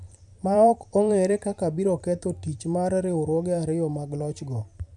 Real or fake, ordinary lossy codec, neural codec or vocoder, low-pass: fake; none; vocoder, 44.1 kHz, 128 mel bands every 512 samples, BigVGAN v2; 10.8 kHz